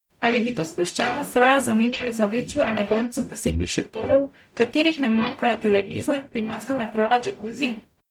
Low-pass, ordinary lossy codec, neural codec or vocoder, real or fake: 19.8 kHz; none; codec, 44.1 kHz, 0.9 kbps, DAC; fake